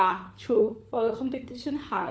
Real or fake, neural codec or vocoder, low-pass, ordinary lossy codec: fake; codec, 16 kHz, 4 kbps, FunCodec, trained on LibriTTS, 50 frames a second; none; none